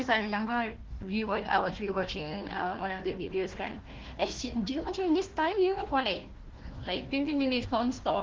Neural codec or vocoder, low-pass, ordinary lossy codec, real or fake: codec, 16 kHz, 1 kbps, FunCodec, trained on Chinese and English, 50 frames a second; 7.2 kHz; Opus, 16 kbps; fake